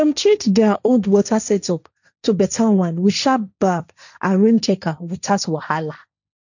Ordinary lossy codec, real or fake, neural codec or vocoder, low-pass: none; fake; codec, 16 kHz, 1.1 kbps, Voila-Tokenizer; 7.2 kHz